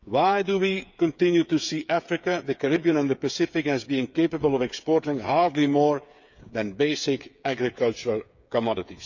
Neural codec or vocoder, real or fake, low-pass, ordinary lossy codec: codec, 16 kHz, 8 kbps, FreqCodec, smaller model; fake; 7.2 kHz; none